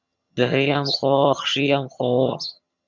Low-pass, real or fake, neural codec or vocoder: 7.2 kHz; fake; vocoder, 22.05 kHz, 80 mel bands, HiFi-GAN